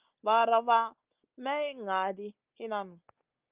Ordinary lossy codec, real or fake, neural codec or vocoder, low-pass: Opus, 24 kbps; real; none; 3.6 kHz